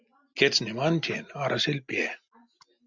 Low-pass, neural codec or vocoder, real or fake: 7.2 kHz; none; real